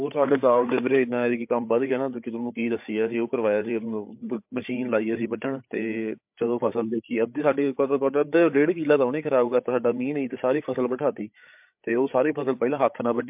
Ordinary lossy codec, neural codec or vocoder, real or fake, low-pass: MP3, 32 kbps; codec, 16 kHz, 8 kbps, FreqCodec, larger model; fake; 3.6 kHz